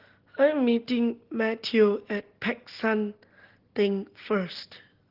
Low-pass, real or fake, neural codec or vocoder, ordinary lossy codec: 5.4 kHz; real; none; Opus, 16 kbps